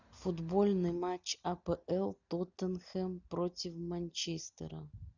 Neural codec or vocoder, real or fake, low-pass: none; real; 7.2 kHz